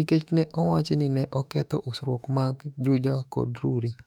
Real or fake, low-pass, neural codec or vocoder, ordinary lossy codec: fake; 19.8 kHz; autoencoder, 48 kHz, 32 numbers a frame, DAC-VAE, trained on Japanese speech; none